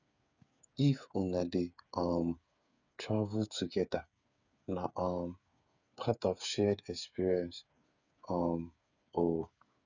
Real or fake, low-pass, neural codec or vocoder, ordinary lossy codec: fake; 7.2 kHz; codec, 16 kHz, 8 kbps, FreqCodec, smaller model; none